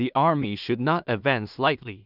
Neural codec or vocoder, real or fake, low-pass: codec, 16 kHz in and 24 kHz out, 0.4 kbps, LongCat-Audio-Codec, two codebook decoder; fake; 5.4 kHz